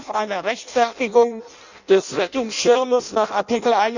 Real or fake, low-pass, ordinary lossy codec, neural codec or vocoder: fake; 7.2 kHz; none; codec, 16 kHz in and 24 kHz out, 0.6 kbps, FireRedTTS-2 codec